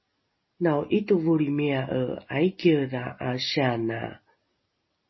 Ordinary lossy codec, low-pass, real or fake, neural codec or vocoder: MP3, 24 kbps; 7.2 kHz; real; none